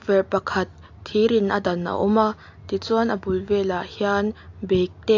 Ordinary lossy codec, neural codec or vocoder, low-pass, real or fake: AAC, 48 kbps; none; 7.2 kHz; real